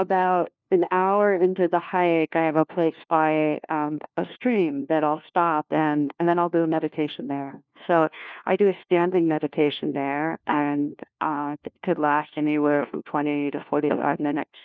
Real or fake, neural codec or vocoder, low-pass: fake; codec, 16 kHz, 1 kbps, FunCodec, trained on LibriTTS, 50 frames a second; 7.2 kHz